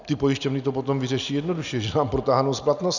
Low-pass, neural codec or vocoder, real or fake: 7.2 kHz; none; real